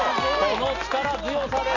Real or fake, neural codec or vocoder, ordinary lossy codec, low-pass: real; none; none; 7.2 kHz